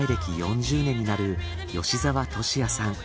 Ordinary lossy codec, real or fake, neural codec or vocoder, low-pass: none; real; none; none